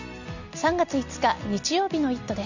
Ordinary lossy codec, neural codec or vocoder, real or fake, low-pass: none; none; real; 7.2 kHz